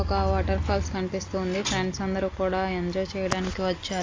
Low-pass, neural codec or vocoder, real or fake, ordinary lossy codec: 7.2 kHz; none; real; AAC, 32 kbps